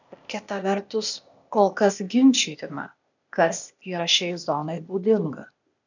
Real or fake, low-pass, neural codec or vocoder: fake; 7.2 kHz; codec, 16 kHz, 0.8 kbps, ZipCodec